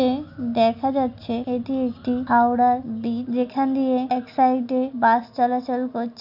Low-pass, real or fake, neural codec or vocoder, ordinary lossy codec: 5.4 kHz; real; none; none